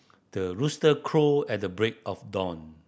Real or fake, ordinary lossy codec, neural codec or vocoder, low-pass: real; none; none; none